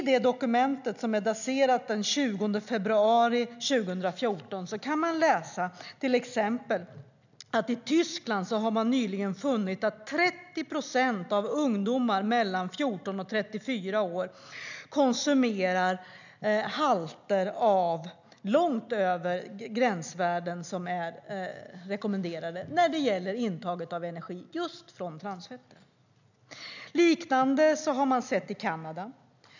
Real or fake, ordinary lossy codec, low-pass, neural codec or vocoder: real; none; 7.2 kHz; none